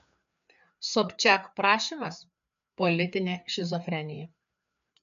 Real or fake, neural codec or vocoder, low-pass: fake; codec, 16 kHz, 4 kbps, FreqCodec, larger model; 7.2 kHz